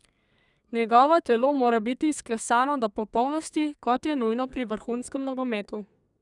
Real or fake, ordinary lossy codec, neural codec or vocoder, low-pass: fake; none; codec, 32 kHz, 1.9 kbps, SNAC; 10.8 kHz